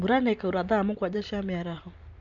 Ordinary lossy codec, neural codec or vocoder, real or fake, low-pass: none; none; real; 7.2 kHz